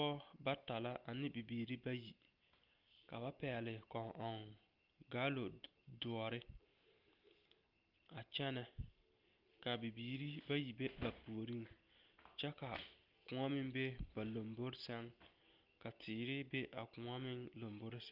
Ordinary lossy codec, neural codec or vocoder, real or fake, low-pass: Opus, 32 kbps; none; real; 5.4 kHz